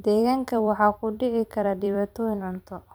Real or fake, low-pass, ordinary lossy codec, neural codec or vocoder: fake; none; none; vocoder, 44.1 kHz, 128 mel bands every 256 samples, BigVGAN v2